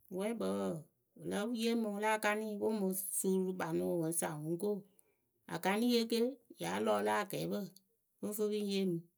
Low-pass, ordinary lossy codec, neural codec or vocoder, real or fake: none; none; none; real